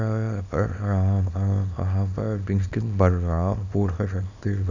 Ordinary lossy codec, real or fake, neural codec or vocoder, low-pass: none; fake; codec, 24 kHz, 0.9 kbps, WavTokenizer, small release; 7.2 kHz